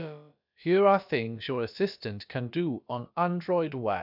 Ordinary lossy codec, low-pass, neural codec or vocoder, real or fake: none; 5.4 kHz; codec, 16 kHz, about 1 kbps, DyCAST, with the encoder's durations; fake